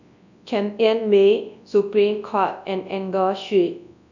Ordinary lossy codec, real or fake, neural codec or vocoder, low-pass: none; fake; codec, 24 kHz, 0.9 kbps, WavTokenizer, large speech release; 7.2 kHz